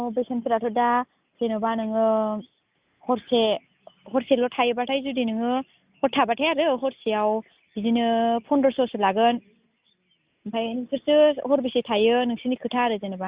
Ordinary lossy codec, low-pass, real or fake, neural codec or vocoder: Opus, 64 kbps; 3.6 kHz; real; none